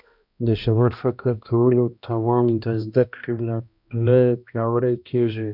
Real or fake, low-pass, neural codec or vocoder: fake; 5.4 kHz; codec, 16 kHz, 1 kbps, X-Codec, HuBERT features, trained on balanced general audio